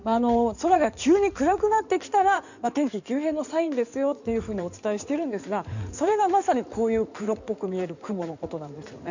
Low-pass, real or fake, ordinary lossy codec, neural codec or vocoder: 7.2 kHz; fake; none; codec, 16 kHz in and 24 kHz out, 2.2 kbps, FireRedTTS-2 codec